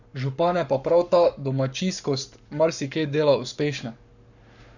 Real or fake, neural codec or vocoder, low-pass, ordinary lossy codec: fake; codec, 44.1 kHz, 7.8 kbps, Pupu-Codec; 7.2 kHz; none